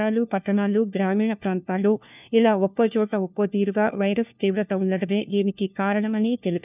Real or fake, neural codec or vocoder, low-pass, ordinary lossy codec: fake; codec, 16 kHz, 1 kbps, FunCodec, trained on LibriTTS, 50 frames a second; 3.6 kHz; none